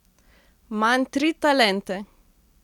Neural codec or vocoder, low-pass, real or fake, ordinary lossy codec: none; 19.8 kHz; real; none